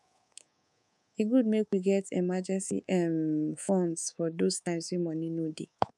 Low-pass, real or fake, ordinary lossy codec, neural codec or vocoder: none; fake; none; codec, 24 kHz, 3.1 kbps, DualCodec